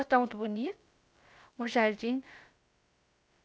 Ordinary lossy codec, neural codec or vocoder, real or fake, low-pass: none; codec, 16 kHz, about 1 kbps, DyCAST, with the encoder's durations; fake; none